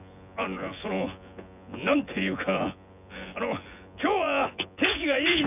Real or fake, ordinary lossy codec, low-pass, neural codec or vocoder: fake; none; 3.6 kHz; vocoder, 24 kHz, 100 mel bands, Vocos